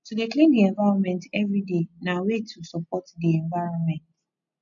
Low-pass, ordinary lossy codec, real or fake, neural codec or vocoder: 7.2 kHz; none; real; none